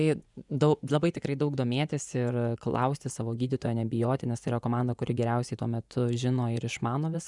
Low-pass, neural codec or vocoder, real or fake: 10.8 kHz; vocoder, 24 kHz, 100 mel bands, Vocos; fake